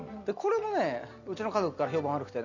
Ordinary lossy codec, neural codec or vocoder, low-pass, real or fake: none; none; 7.2 kHz; real